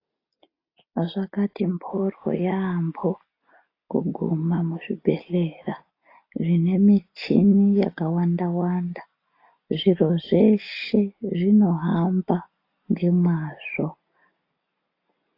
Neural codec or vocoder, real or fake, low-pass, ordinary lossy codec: none; real; 5.4 kHz; AAC, 32 kbps